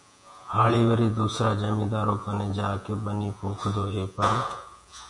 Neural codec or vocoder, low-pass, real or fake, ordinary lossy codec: vocoder, 48 kHz, 128 mel bands, Vocos; 10.8 kHz; fake; MP3, 64 kbps